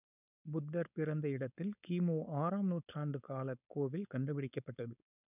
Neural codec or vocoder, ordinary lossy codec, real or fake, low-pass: codec, 16 kHz, 4.8 kbps, FACodec; none; fake; 3.6 kHz